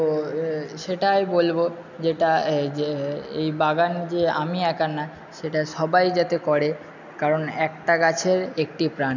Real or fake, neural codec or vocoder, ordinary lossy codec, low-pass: real; none; none; 7.2 kHz